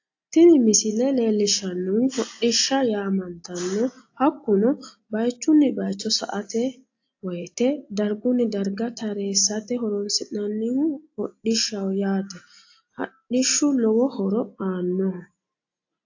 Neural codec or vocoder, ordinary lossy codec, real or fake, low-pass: none; AAC, 48 kbps; real; 7.2 kHz